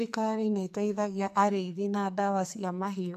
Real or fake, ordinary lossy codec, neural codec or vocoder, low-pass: fake; none; codec, 44.1 kHz, 2.6 kbps, SNAC; 14.4 kHz